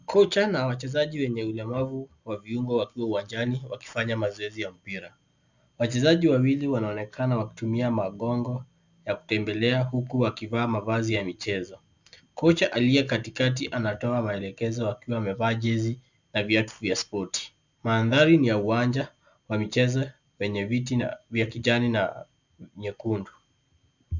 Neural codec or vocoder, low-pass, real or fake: none; 7.2 kHz; real